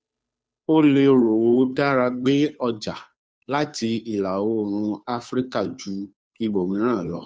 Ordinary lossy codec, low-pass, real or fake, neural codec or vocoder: none; none; fake; codec, 16 kHz, 2 kbps, FunCodec, trained on Chinese and English, 25 frames a second